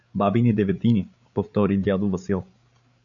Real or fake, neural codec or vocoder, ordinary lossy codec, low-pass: fake; codec, 16 kHz, 8 kbps, FreqCodec, larger model; MP3, 96 kbps; 7.2 kHz